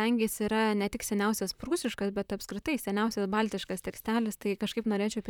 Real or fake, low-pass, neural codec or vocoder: fake; 19.8 kHz; vocoder, 44.1 kHz, 128 mel bands, Pupu-Vocoder